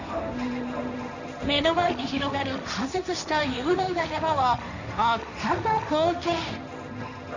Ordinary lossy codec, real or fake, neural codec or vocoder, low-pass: none; fake; codec, 16 kHz, 1.1 kbps, Voila-Tokenizer; 7.2 kHz